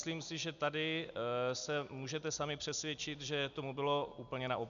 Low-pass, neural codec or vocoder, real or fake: 7.2 kHz; none; real